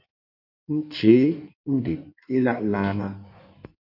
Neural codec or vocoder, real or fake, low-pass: codec, 16 kHz in and 24 kHz out, 1.1 kbps, FireRedTTS-2 codec; fake; 5.4 kHz